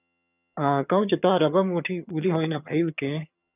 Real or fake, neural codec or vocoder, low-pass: fake; vocoder, 22.05 kHz, 80 mel bands, HiFi-GAN; 3.6 kHz